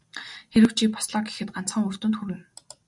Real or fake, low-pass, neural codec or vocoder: real; 10.8 kHz; none